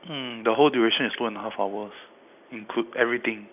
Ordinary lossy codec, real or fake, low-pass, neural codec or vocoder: none; real; 3.6 kHz; none